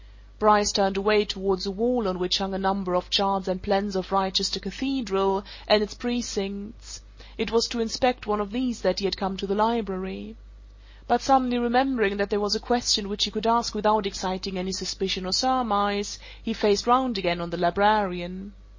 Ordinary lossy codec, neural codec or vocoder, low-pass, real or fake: MP3, 32 kbps; none; 7.2 kHz; real